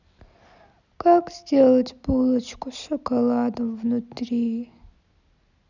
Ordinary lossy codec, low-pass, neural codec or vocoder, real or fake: none; 7.2 kHz; none; real